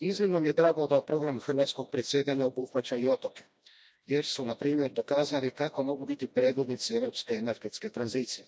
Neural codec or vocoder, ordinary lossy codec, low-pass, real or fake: codec, 16 kHz, 1 kbps, FreqCodec, smaller model; none; none; fake